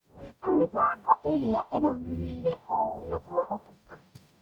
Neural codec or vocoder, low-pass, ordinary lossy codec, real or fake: codec, 44.1 kHz, 0.9 kbps, DAC; 19.8 kHz; none; fake